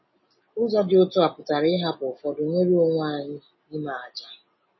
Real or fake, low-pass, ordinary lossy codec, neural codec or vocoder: real; 7.2 kHz; MP3, 24 kbps; none